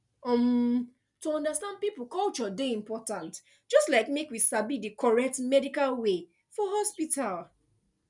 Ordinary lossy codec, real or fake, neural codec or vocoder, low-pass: none; real; none; 10.8 kHz